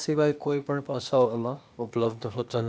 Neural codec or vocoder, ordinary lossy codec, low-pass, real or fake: codec, 16 kHz, 0.8 kbps, ZipCodec; none; none; fake